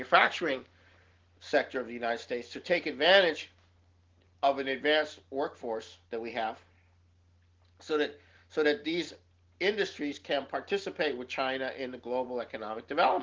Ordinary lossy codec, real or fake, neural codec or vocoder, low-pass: Opus, 16 kbps; real; none; 7.2 kHz